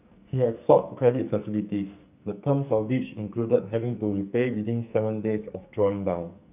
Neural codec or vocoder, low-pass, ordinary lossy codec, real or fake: codec, 44.1 kHz, 2.6 kbps, SNAC; 3.6 kHz; none; fake